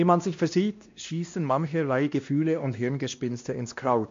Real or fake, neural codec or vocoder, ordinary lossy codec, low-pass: fake; codec, 16 kHz, 1 kbps, X-Codec, WavLM features, trained on Multilingual LibriSpeech; MP3, 48 kbps; 7.2 kHz